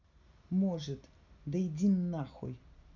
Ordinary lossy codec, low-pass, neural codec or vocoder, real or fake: none; 7.2 kHz; none; real